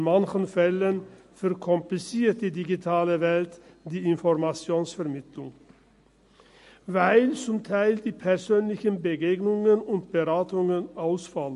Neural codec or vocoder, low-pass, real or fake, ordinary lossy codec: none; 14.4 kHz; real; MP3, 48 kbps